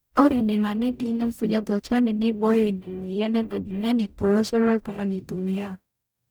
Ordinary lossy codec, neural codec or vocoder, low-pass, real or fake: none; codec, 44.1 kHz, 0.9 kbps, DAC; none; fake